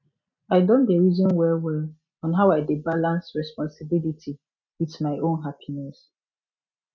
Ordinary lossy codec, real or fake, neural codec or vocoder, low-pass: none; real; none; 7.2 kHz